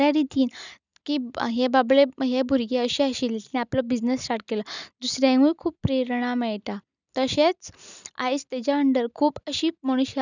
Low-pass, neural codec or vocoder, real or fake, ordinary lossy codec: 7.2 kHz; none; real; none